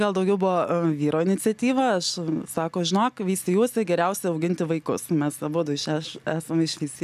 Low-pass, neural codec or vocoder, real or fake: 14.4 kHz; none; real